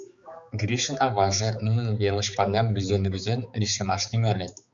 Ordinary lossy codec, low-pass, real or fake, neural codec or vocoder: Opus, 64 kbps; 7.2 kHz; fake; codec, 16 kHz, 4 kbps, X-Codec, HuBERT features, trained on balanced general audio